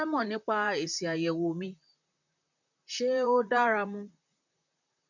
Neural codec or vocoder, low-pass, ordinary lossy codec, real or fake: vocoder, 44.1 kHz, 128 mel bands, Pupu-Vocoder; 7.2 kHz; none; fake